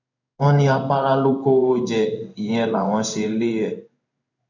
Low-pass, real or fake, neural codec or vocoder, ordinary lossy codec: 7.2 kHz; fake; codec, 16 kHz in and 24 kHz out, 1 kbps, XY-Tokenizer; none